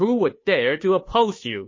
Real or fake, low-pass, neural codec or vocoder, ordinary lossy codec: fake; 7.2 kHz; codec, 16 kHz, 2 kbps, FunCodec, trained on Chinese and English, 25 frames a second; MP3, 32 kbps